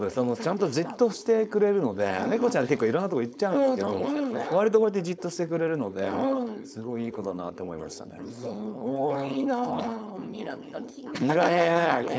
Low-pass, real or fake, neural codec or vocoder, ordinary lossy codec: none; fake; codec, 16 kHz, 4.8 kbps, FACodec; none